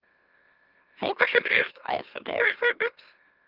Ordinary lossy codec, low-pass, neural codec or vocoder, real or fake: Opus, 32 kbps; 5.4 kHz; autoencoder, 44.1 kHz, a latent of 192 numbers a frame, MeloTTS; fake